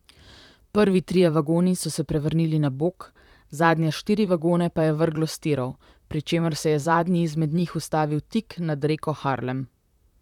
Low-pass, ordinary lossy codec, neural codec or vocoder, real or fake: 19.8 kHz; none; vocoder, 44.1 kHz, 128 mel bands, Pupu-Vocoder; fake